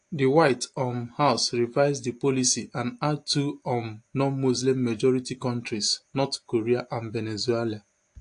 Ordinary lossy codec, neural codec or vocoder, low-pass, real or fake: AAC, 48 kbps; none; 9.9 kHz; real